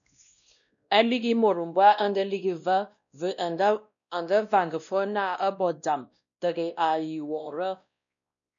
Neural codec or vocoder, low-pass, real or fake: codec, 16 kHz, 1 kbps, X-Codec, WavLM features, trained on Multilingual LibriSpeech; 7.2 kHz; fake